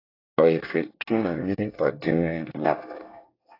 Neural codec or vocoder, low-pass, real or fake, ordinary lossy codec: codec, 24 kHz, 1 kbps, SNAC; 5.4 kHz; fake; AAC, 32 kbps